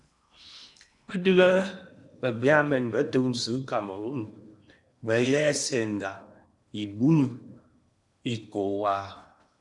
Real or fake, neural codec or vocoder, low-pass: fake; codec, 16 kHz in and 24 kHz out, 0.8 kbps, FocalCodec, streaming, 65536 codes; 10.8 kHz